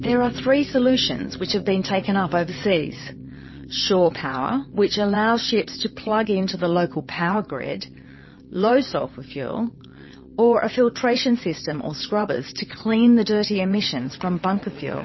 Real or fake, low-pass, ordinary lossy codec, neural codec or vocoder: fake; 7.2 kHz; MP3, 24 kbps; vocoder, 22.05 kHz, 80 mel bands, WaveNeXt